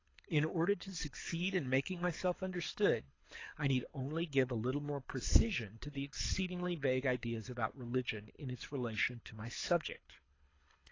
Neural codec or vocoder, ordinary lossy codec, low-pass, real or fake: codec, 24 kHz, 6 kbps, HILCodec; AAC, 32 kbps; 7.2 kHz; fake